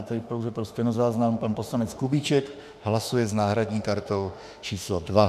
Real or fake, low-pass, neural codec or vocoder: fake; 14.4 kHz; autoencoder, 48 kHz, 32 numbers a frame, DAC-VAE, trained on Japanese speech